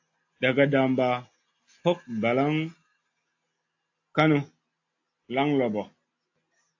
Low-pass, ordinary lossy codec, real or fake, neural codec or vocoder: 7.2 kHz; AAC, 48 kbps; real; none